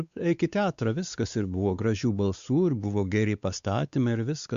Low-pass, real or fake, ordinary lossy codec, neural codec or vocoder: 7.2 kHz; fake; Opus, 64 kbps; codec, 16 kHz, 4 kbps, X-Codec, WavLM features, trained on Multilingual LibriSpeech